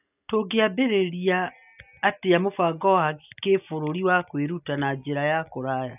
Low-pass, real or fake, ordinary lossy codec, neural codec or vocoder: 3.6 kHz; real; none; none